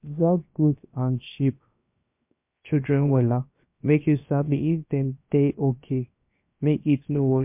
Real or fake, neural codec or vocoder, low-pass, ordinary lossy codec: fake; codec, 16 kHz, 0.7 kbps, FocalCodec; 3.6 kHz; MP3, 32 kbps